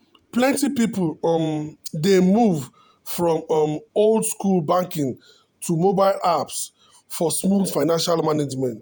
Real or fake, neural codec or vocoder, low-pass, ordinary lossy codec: fake; vocoder, 48 kHz, 128 mel bands, Vocos; none; none